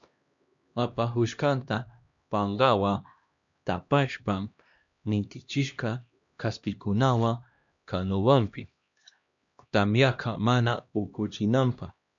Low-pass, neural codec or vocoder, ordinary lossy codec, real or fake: 7.2 kHz; codec, 16 kHz, 1 kbps, X-Codec, HuBERT features, trained on LibriSpeech; MP3, 64 kbps; fake